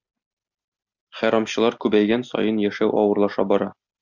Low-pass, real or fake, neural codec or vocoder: 7.2 kHz; real; none